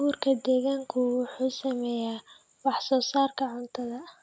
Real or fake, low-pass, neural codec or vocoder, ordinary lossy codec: real; none; none; none